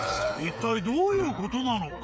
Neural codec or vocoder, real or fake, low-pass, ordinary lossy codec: codec, 16 kHz, 8 kbps, FreqCodec, smaller model; fake; none; none